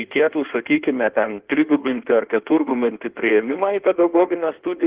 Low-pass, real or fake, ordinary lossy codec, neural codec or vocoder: 3.6 kHz; fake; Opus, 16 kbps; codec, 16 kHz in and 24 kHz out, 1.1 kbps, FireRedTTS-2 codec